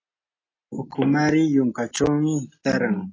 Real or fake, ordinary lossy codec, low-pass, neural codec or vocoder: real; AAC, 32 kbps; 7.2 kHz; none